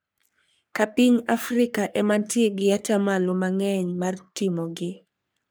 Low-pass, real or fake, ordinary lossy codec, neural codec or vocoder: none; fake; none; codec, 44.1 kHz, 3.4 kbps, Pupu-Codec